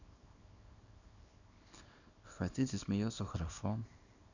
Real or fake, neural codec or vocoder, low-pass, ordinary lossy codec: fake; codec, 24 kHz, 0.9 kbps, WavTokenizer, small release; 7.2 kHz; none